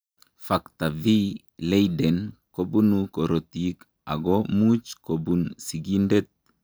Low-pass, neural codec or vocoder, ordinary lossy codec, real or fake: none; none; none; real